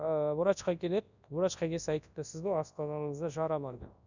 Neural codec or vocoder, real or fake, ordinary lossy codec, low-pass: codec, 24 kHz, 0.9 kbps, WavTokenizer, large speech release; fake; none; 7.2 kHz